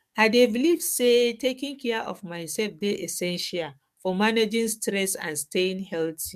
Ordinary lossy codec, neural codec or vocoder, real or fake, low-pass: MP3, 96 kbps; codec, 44.1 kHz, 7.8 kbps, DAC; fake; 14.4 kHz